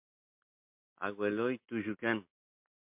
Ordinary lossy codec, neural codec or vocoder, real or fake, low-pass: MP3, 32 kbps; none; real; 3.6 kHz